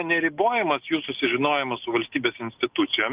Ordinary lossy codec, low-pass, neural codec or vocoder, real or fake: Opus, 32 kbps; 3.6 kHz; none; real